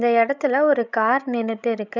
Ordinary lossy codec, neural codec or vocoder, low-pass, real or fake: none; codec, 16 kHz, 16 kbps, FreqCodec, larger model; 7.2 kHz; fake